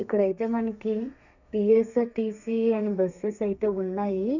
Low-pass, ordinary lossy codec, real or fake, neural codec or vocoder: 7.2 kHz; none; fake; codec, 32 kHz, 1.9 kbps, SNAC